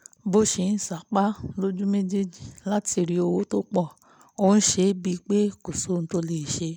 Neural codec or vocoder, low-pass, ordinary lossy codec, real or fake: none; none; none; real